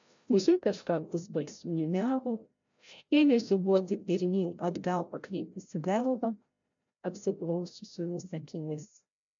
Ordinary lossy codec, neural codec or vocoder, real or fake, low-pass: MP3, 64 kbps; codec, 16 kHz, 0.5 kbps, FreqCodec, larger model; fake; 7.2 kHz